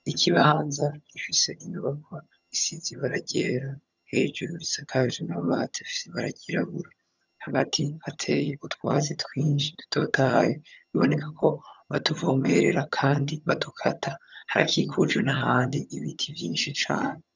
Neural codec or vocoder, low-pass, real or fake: vocoder, 22.05 kHz, 80 mel bands, HiFi-GAN; 7.2 kHz; fake